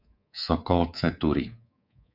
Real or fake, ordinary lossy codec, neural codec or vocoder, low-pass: fake; MP3, 48 kbps; codec, 24 kHz, 3.1 kbps, DualCodec; 5.4 kHz